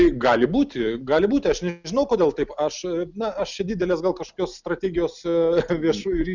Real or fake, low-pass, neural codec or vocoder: real; 7.2 kHz; none